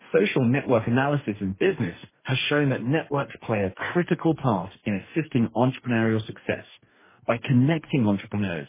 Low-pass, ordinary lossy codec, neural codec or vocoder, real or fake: 3.6 kHz; MP3, 16 kbps; codec, 44.1 kHz, 2.6 kbps, DAC; fake